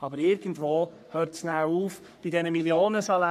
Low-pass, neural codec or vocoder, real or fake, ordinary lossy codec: 14.4 kHz; codec, 44.1 kHz, 3.4 kbps, Pupu-Codec; fake; none